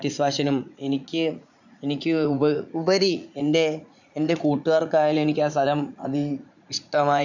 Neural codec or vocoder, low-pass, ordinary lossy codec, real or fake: vocoder, 44.1 kHz, 80 mel bands, Vocos; 7.2 kHz; none; fake